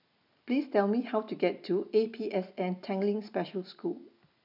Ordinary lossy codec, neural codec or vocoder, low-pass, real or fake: none; none; 5.4 kHz; real